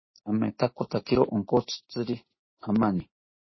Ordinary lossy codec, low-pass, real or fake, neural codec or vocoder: MP3, 24 kbps; 7.2 kHz; real; none